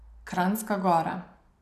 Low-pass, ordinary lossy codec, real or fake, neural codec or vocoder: 14.4 kHz; none; real; none